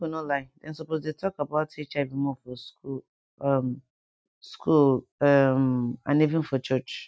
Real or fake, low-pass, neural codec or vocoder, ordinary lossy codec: real; none; none; none